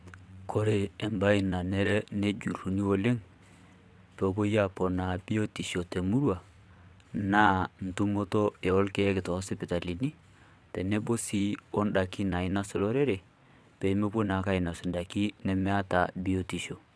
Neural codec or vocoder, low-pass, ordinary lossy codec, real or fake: vocoder, 22.05 kHz, 80 mel bands, WaveNeXt; none; none; fake